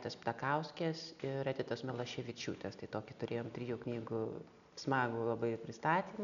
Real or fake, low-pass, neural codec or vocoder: real; 7.2 kHz; none